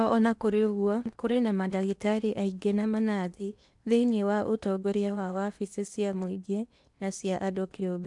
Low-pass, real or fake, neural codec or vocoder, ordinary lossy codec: 10.8 kHz; fake; codec, 16 kHz in and 24 kHz out, 0.8 kbps, FocalCodec, streaming, 65536 codes; none